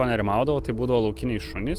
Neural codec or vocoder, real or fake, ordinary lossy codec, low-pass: none; real; Opus, 24 kbps; 14.4 kHz